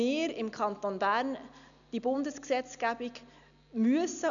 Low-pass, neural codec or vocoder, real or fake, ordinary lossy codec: 7.2 kHz; none; real; none